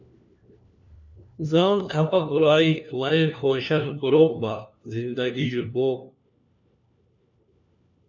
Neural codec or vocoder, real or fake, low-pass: codec, 16 kHz, 1 kbps, FunCodec, trained on LibriTTS, 50 frames a second; fake; 7.2 kHz